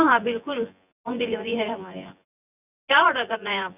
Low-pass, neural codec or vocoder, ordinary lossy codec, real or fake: 3.6 kHz; vocoder, 24 kHz, 100 mel bands, Vocos; none; fake